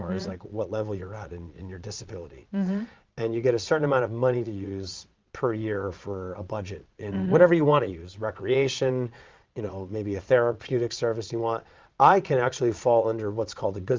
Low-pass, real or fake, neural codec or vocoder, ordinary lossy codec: 7.2 kHz; fake; vocoder, 22.05 kHz, 80 mel bands, Vocos; Opus, 24 kbps